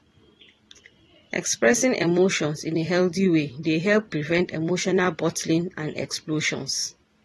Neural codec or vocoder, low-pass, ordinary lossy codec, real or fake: vocoder, 44.1 kHz, 128 mel bands every 256 samples, BigVGAN v2; 19.8 kHz; AAC, 32 kbps; fake